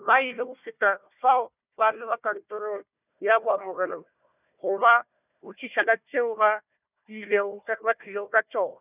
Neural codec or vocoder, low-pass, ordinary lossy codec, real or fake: codec, 16 kHz, 1 kbps, FunCodec, trained on Chinese and English, 50 frames a second; 3.6 kHz; none; fake